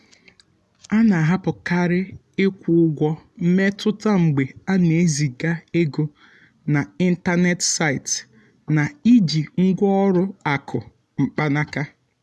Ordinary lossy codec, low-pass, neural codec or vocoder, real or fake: none; none; none; real